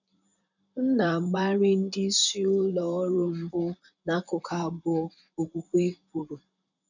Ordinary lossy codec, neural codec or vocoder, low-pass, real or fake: none; vocoder, 44.1 kHz, 128 mel bands, Pupu-Vocoder; 7.2 kHz; fake